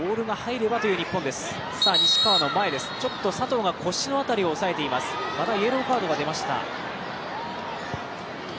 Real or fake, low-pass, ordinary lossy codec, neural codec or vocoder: real; none; none; none